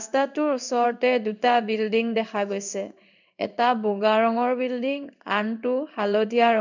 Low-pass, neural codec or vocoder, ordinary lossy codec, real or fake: 7.2 kHz; codec, 16 kHz in and 24 kHz out, 1 kbps, XY-Tokenizer; none; fake